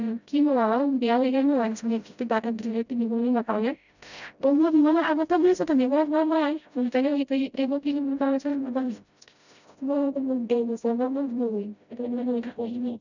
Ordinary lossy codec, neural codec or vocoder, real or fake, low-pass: none; codec, 16 kHz, 0.5 kbps, FreqCodec, smaller model; fake; 7.2 kHz